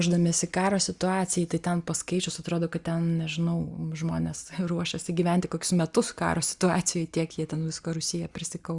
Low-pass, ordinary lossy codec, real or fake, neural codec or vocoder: 10.8 kHz; Opus, 64 kbps; real; none